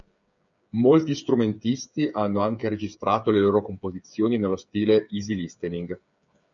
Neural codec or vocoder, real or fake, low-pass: codec, 16 kHz, 8 kbps, FreqCodec, smaller model; fake; 7.2 kHz